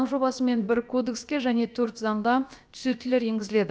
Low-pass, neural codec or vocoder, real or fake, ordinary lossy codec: none; codec, 16 kHz, about 1 kbps, DyCAST, with the encoder's durations; fake; none